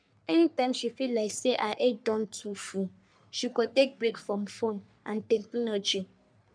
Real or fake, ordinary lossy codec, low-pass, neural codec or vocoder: fake; none; 9.9 kHz; codec, 44.1 kHz, 3.4 kbps, Pupu-Codec